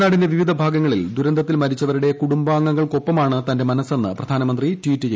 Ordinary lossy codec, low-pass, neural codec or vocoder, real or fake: none; none; none; real